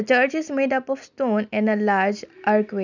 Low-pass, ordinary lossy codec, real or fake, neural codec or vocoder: 7.2 kHz; none; real; none